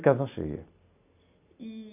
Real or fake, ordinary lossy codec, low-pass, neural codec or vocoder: real; none; 3.6 kHz; none